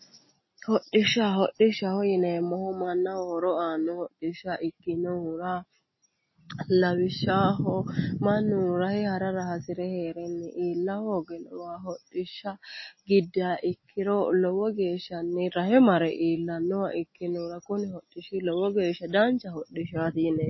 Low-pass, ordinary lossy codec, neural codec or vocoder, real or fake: 7.2 kHz; MP3, 24 kbps; none; real